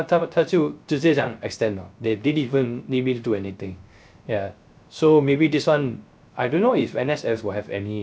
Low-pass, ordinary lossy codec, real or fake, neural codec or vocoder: none; none; fake; codec, 16 kHz, 0.3 kbps, FocalCodec